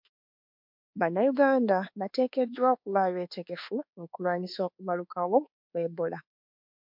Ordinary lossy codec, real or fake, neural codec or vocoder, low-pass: MP3, 48 kbps; fake; codec, 24 kHz, 1.2 kbps, DualCodec; 5.4 kHz